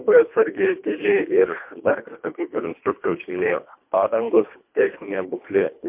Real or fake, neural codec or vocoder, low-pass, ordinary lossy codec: fake; codec, 24 kHz, 1.5 kbps, HILCodec; 3.6 kHz; MP3, 32 kbps